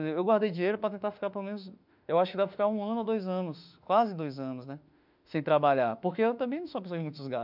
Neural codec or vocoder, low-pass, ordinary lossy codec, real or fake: autoencoder, 48 kHz, 32 numbers a frame, DAC-VAE, trained on Japanese speech; 5.4 kHz; none; fake